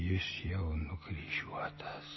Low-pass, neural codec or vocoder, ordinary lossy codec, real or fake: 7.2 kHz; none; MP3, 24 kbps; real